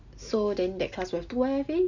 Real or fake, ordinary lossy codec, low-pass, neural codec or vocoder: real; none; 7.2 kHz; none